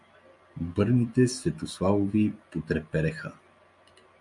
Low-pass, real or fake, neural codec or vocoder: 10.8 kHz; real; none